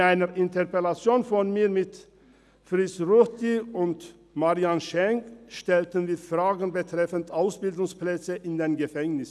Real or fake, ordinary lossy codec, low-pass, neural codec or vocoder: real; none; none; none